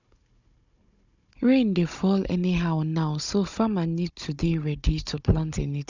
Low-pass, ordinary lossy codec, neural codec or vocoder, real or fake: 7.2 kHz; none; codec, 16 kHz, 16 kbps, FunCodec, trained on Chinese and English, 50 frames a second; fake